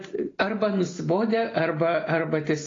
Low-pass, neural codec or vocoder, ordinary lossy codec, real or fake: 7.2 kHz; none; AAC, 32 kbps; real